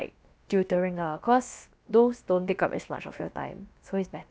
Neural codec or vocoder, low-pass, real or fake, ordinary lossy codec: codec, 16 kHz, about 1 kbps, DyCAST, with the encoder's durations; none; fake; none